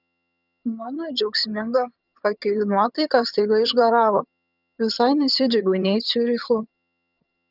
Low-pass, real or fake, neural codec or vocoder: 5.4 kHz; fake; vocoder, 22.05 kHz, 80 mel bands, HiFi-GAN